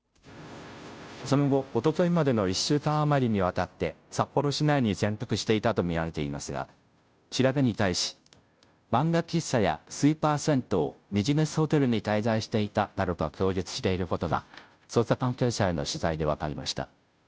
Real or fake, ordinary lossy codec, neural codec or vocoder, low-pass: fake; none; codec, 16 kHz, 0.5 kbps, FunCodec, trained on Chinese and English, 25 frames a second; none